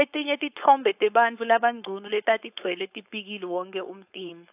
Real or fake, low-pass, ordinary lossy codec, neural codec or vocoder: fake; 3.6 kHz; AAC, 32 kbps; codec, 16 kHz, 4.8 kbps, FACodec